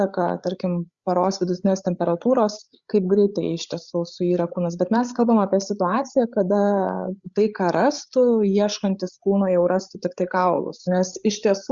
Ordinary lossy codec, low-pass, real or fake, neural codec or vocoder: Opus, 64 kbps; 7.2 kHz; fake; codec, 16 kHz, 8 kbps, FreqCodec, larger model